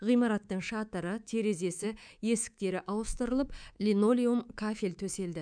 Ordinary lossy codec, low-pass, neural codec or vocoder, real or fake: none; 9.9 kHz; codec, 24 kHz, 3.1 kbps, DualCodec; fake